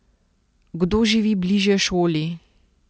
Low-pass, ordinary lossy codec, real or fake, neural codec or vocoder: none; none; real; none